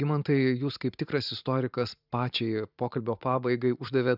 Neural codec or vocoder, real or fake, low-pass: vocoder, 44.1 kHz, 128 mel bands every 512 samples, BigVGAN v2; fake; 5.4 kHz